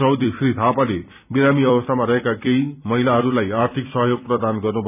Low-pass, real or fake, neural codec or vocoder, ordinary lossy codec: 3.6 kHz; fake; vocoder, 44.1 kHz, 128 mel bands every 256 samples, BigVGAN v2; none